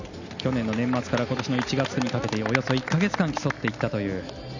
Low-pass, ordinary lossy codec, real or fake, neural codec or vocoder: 7.2 kHz; none; real; none